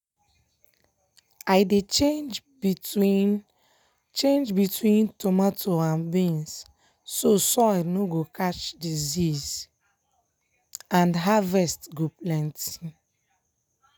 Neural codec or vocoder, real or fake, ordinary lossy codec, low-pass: none; real; none; none